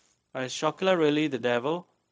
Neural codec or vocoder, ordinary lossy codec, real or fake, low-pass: codec, 16 kHz, 0.4 kbps, LongCat-Audio-Codec; none; fake; none